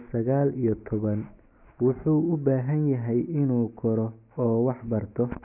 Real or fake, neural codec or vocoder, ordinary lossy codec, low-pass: real; none; none; 3.6 kHz